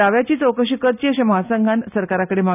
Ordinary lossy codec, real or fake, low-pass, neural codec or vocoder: none; real; 3.6 kHz; none